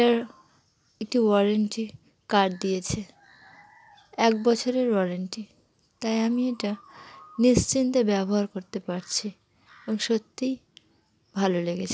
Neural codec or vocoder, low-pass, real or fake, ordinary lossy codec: none; none; real; none